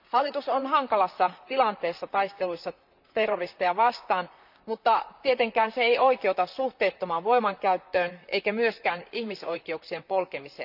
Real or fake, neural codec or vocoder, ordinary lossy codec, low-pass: fake; vocoder, 44.1 kHz, 128 mel bands, Pupu-Vocoder; none; 5.4 kHz